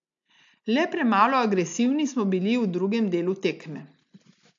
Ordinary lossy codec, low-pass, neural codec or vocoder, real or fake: AAC, 64 kbps; 7.2 kHz; none; real